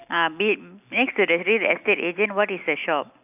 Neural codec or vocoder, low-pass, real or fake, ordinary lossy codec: none; 3.6 kHz; real; none